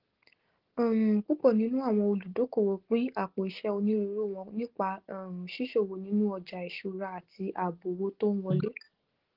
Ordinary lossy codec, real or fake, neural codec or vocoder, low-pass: Opus, 16 kbps; real; none; 5.4 kHz